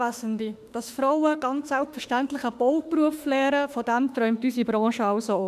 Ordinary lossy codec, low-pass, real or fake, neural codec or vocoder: none; 14.4 kHz; fake; autoencoder, 48 kHz, 32 numbers a frame, DAC-VAE, trained on Japanese speech